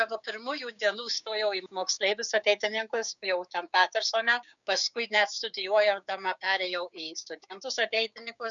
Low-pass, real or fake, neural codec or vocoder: 7.2 kHz; fake; codec, 16 kHz, 6 kbps, DAC